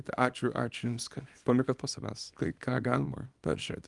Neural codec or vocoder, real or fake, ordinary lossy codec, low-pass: codec, 24 kHz, 0.9 kbps, WavTokenizer, small release; fake; Opus, 32 kbps; 10.8 kHz